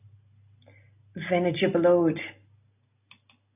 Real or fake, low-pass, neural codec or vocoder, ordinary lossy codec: real; 3.6 kHz; none; AAC, 32 kbps